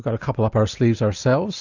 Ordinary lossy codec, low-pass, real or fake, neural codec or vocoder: Opus, 64 kbps; 7.2 kHz; real; none